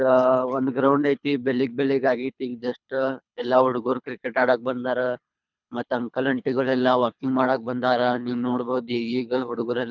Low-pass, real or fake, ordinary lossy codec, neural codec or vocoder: 7.2 kHz; fake; none; codec, 24 kHz, 3 kbps, HILCodec